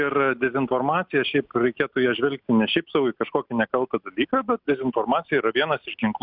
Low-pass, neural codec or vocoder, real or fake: 5.4 kHz; none; real